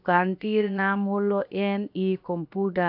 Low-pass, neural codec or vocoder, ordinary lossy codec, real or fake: 5.4 kHz; codec, 16 kHz, 0.7 kbps, FocalCodec; AAC, 48 kbps; fake